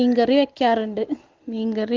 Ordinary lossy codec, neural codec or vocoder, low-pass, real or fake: Opus, 16 kbps; none; 7.2 kHz; real